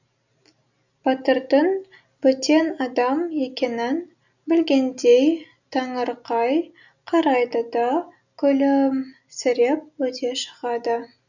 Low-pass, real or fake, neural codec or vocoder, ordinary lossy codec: 7.2 kHz; real; none; none